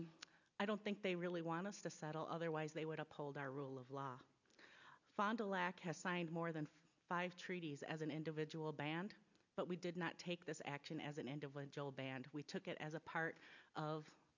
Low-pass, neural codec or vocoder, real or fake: 7.2 kHz; none; real